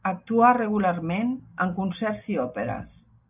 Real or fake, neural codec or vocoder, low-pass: real; none; 3.6 kHz